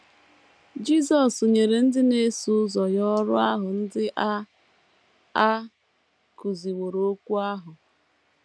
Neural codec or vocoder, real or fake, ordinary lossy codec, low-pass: none; real; none; none